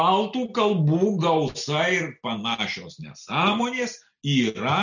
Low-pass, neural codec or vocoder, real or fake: 7.2 kHz; none; real